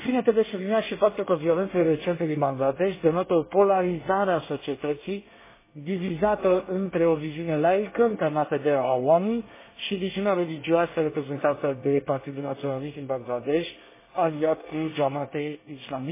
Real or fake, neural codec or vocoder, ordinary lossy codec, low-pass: fake; codec, 24 kHz, 1 kbps, SNAC; MP3, 16 kbps; 3.6 kHz